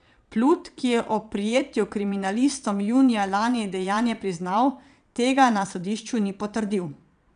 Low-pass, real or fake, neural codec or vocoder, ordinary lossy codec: 9.9 kHz; fake; vocoder, 22.05 kHz, 80 mel bands, Vocos; none